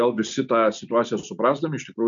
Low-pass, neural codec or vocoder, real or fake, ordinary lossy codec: 7.2 kHz; none; real; MP3, 64 kbps